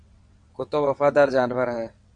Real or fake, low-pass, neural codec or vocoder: fake; 9.9 kHz; vocoder, 22.05 kHz, 80 mel bands, WaveNeXt